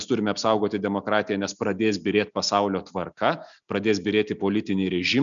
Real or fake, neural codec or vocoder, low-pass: real; none; 7.2 kHz